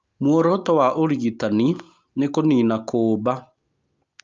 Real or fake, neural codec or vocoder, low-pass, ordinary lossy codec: real; none; 7.2 kHz; Opus, 24 kbps